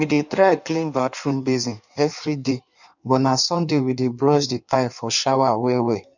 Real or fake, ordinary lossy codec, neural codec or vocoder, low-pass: fake; none; codec, 16 kHz in and 24 kHz out, 1.1 kbps, FireRedTTS-2 codec; 7.2 kHz